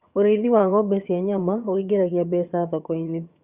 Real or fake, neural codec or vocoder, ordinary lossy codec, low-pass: fake; vocoder, 22.05 kHz, 80 mel bands, HiFi-GAN; Opus, 64 kbps; 3.6 kHz